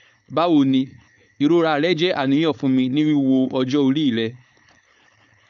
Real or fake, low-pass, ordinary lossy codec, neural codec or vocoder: fake; 7.2 kHz; none; codec, 16 kHz, 4.8 kbps, FACodec